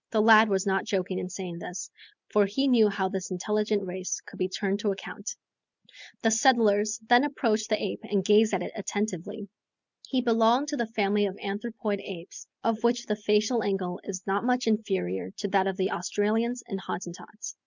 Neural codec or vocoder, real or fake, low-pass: none; real; 7.2 kHz